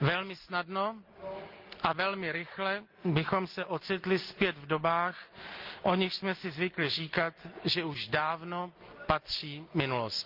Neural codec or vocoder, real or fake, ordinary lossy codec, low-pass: none; real; Opus, 24 kbps; 5.4 kHz